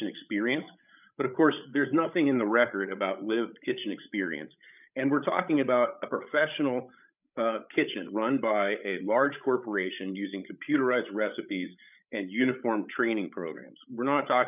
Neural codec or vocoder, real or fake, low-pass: codec, 16 kHz, 16 kbps, FreqCodec, larger model; fake; 3.6 kHz